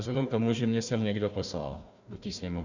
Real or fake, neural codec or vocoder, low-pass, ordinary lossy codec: fake; codec, 16 kHz, 1 kbps, FunCodec, trained on Chinese and English, 50 frames a second; 7.2 kHz; Opus, 64 kbps